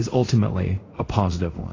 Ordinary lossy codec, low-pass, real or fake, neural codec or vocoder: AAC, 32 kbps; 7.2 kHz; fake; codec, 24 kHz, 0.5 kbps, DualCodec